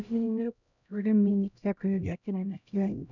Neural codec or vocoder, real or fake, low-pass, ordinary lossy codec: codec, 16 kHz, 0.5 kbps, X-Codec, HuBERT features, trained on LibriSpeech; fake; 7.2 kHz; none